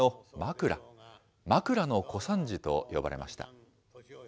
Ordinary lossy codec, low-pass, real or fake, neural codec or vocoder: none; none; real; none